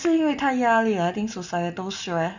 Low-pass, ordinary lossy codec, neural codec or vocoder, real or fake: 7.2 kHz; none; none; real